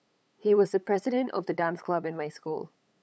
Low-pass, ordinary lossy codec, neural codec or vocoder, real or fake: none; none; codec, 16 kHz, 8 kbps, FunCodec, trained on LibriTTS, 25 frames a second; fake